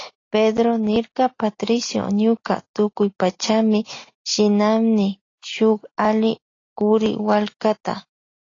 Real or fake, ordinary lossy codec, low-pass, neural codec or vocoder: real; AAC, 32 kbps; 7.2 kHz; none